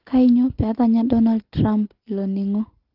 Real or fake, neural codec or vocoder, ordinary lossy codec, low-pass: real; none; Opus, 16 kbps; 5.4 kHz